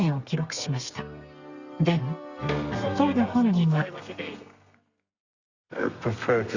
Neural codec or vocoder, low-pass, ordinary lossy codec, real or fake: codec, 32 kHz, 1.9 kbps, SNAC; 7.2 kHz; Opus, 64 kbps; fake